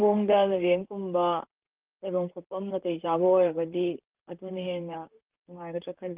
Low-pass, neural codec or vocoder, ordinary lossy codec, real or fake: 3.6 kHz; vocoder, 44.1 kHz, 128 mel bands, Pupu-Vocoder; Opus, 32 kbps; fake